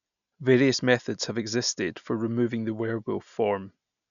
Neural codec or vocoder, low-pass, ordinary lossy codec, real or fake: none; 7.2 kHz; none; real